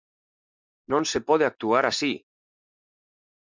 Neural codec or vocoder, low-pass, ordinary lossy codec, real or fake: none; 7.2 kHz; MP3, 64 kbps; real